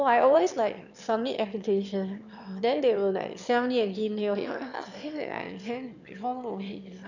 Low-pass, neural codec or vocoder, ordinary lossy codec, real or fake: 7.2 kHz; autoencoder, 22.05 kHz, a latent of 192 numbers a frame, VITS, trained on one speaker; none; fake